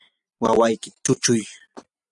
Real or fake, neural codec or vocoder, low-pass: real; none; 10.8 kHz